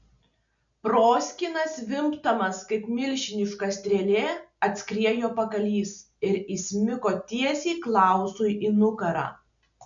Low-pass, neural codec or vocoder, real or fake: 7.2 kHz; none; real